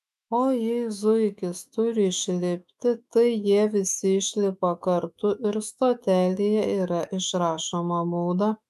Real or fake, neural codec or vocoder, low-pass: fake; autoencoder, 48 kHz, 128 numbers a frame, DAC-VAE, trained on Japanese speech; 14.4 kHz